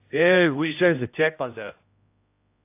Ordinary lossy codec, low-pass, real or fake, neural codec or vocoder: AAC, 24 kbps; 3.6 kHz; fake; codec, 16 kHz, 0.5 kbps, X-Codec, HuBERT features, trained on general audio